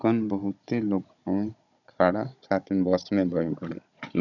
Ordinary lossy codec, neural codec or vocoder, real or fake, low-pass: none; codec, 16 kHz, 16 kbps, FunCodec, trained on Chinese and English, 50 frames a second; fake; 7.2 kHz